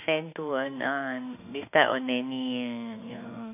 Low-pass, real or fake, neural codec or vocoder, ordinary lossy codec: 3.6 kHz; fake; autoencoder, 48 kHz, 32 numbers a frame, DAC-VAE, trained on Japanese speech; none